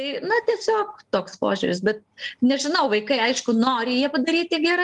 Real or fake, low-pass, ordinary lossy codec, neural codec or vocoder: real; 10.8 kHz; Opus, 24 kbps; none